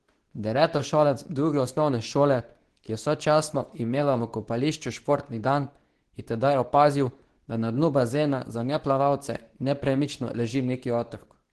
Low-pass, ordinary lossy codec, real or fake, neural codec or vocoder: 10.8 kHz; Opus, 16 kbps; fake; codec, 24 kHz, 0.9 kbps, WavTokenizer, medium speech release version 2